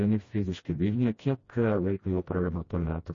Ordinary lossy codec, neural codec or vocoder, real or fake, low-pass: MP3, 32 kbps; codec, 16 kHz, 1 kbps, FreqCodec, smaller model; fake; 7.2 kHz